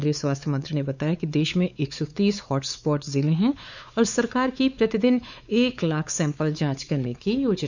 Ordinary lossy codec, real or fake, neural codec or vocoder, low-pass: none; fake; codec, 16 kHz, 4 kbps, X-Codec, WavLM features, trained on Multilingual LibriSpeech; 7.2 kHz